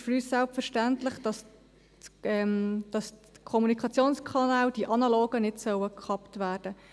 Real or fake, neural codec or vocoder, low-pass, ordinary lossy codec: real; none; none; none